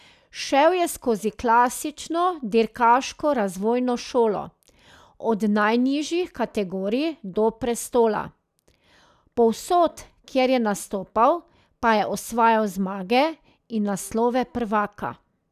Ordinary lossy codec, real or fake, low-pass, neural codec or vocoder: none; real; 14.4 kHz; none